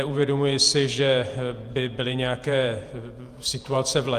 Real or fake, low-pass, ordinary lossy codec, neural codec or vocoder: fake; 14.4 kHz; Opus, 32 kbps; vocoder, 48 kHz, 128 mel bands, Vocos